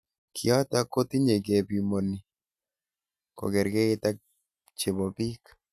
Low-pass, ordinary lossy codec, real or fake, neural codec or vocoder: 14.4 kHz; none; real; none